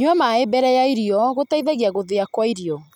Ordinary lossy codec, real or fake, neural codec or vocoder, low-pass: none; real; none; 19.8 kHz